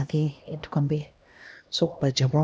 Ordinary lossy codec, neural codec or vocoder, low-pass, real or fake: none; codec, 16 kHz, 1 kbps, X-Codec, HuBERT features, trained on LibriSpeech; none; fake